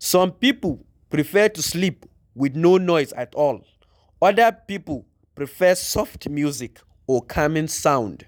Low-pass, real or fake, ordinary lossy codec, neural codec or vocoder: none; real; none; none